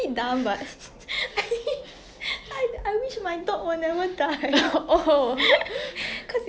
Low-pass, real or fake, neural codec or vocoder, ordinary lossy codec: none; real; none; none